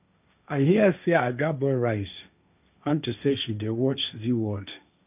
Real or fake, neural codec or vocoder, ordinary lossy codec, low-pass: fake; codec, 16 kHz, 1.1 kbps, Voila-Tokenizer; none; 3.6 kHz